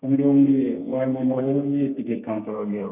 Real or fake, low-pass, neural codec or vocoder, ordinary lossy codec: fake; 3.6 kHz; codec, 32 kHz, 1.9 kbps, SNAC; none